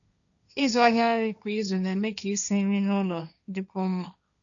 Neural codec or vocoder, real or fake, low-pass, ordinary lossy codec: codec, 16 kHz, 1.1 kbps, Voila-Tokenizer; fake; 7.2 kHz; none